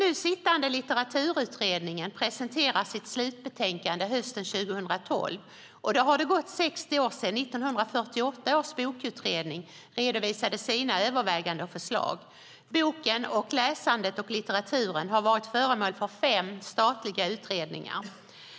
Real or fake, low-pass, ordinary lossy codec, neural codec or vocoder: real; none; none; none